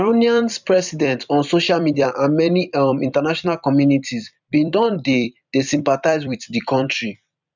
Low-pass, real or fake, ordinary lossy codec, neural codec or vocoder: 7.2 kHz; fake; none; vocoder, 44.1 kHz, 128 mel bands every 256 samples, BigVGAN v2